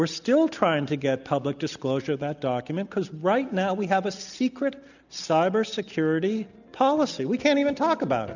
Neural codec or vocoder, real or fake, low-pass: none; real; 7.2 kHz